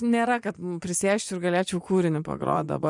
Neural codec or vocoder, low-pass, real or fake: vocoder, 24 kHz, 100 mel bands, Vocos; 10.8 kHz; fake